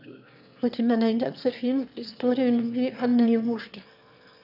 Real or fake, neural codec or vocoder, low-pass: fake; autoencoder, 22.05 kHz, a latent of 192 numbers a frame, VITS, trained on one speaker; 5.4 kHz